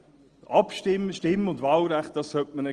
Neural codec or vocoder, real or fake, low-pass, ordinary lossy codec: none; real; 9.9 kHz; Opus, 24 kbps